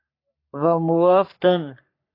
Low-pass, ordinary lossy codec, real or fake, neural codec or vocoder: 5.4 kHz; AAC, 32 kbps; fake; codec, 44.1 kHz, 3.4 kbps, Pupu-Codec